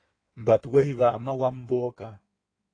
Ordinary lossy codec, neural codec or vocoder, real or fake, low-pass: AAC, 32 kbps; codec, 16 kHz in and 24 kHz out, 1.1 kbps, FireRedTTS-2 codec; fake; 9.9 kHz